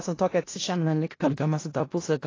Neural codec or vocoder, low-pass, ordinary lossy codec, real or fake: codec, 16 kHz in and 24 kHz out, 0.4 kbps, LongCat-Audio-Codec, four codebook decoder; 7.2 kHz; AAC, 32 kbps; fake